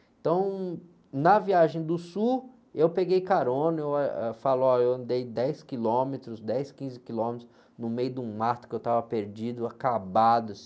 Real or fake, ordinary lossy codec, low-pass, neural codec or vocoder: real; none; none; none